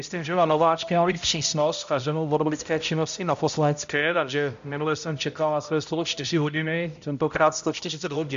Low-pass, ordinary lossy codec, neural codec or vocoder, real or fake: 7.2 kHz; MP3, 64 kbps; codec, 16 kHz, 0.5 kbps, X-Codec, HuBERT features, trained on balanced general audio; fake